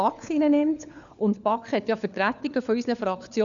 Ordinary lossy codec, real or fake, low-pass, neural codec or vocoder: none; fake; 7.2 kHz; codec, 16 kHz, 4 kbps, FunCodec, trained on LibriTTS, 50 frames a second